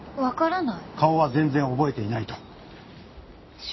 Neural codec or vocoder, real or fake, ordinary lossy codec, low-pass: none; real; MP3, 24 kbps; 7.2 kHz